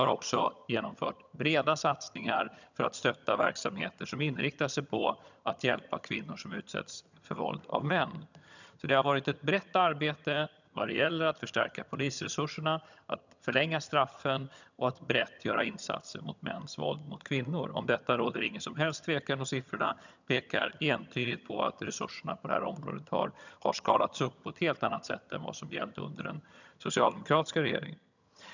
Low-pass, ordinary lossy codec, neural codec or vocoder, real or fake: 7.2 kHz; none; vocoder, 22.05 kHz, 80 mel bands, HiFi-GAN; fake